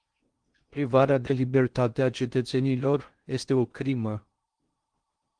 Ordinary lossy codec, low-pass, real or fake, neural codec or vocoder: Opus, 24 kbps; 9.9 kHz; fake; codec, 16 kHz in and 24 kHz out, 0.6 kbps, FocalCodec, streaming, 2048 codes